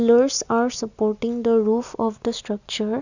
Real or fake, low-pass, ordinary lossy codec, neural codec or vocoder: real; 7.2 kHz; none; none